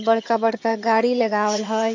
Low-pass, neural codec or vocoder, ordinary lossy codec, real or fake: 7.2 kHz; vocoder, 22.05 kHz, 80 mel bands, HiFi-GAN; AAC, 48 kbps; fake